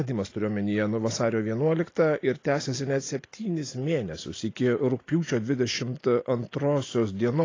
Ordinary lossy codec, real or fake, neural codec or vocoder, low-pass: AAC, 32 kbps; real; none; 7.2 kHz